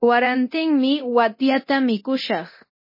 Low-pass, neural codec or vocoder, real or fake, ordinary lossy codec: 5.4 kHz; codec, 24 kHz, 0.9 kbps, DualCodec; fake; MP3, 24 kbps